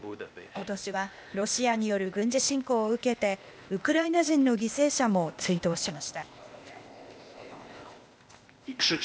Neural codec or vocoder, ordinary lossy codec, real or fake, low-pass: codec, 16 kHz, 0.8 kbps, ZipCodec; none; fake; none